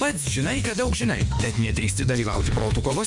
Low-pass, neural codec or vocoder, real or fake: 10.8 kHz; autoencoder, 48 kHz, 32 numbers a frame, DAC-VAE, trained on Japanese speech; fake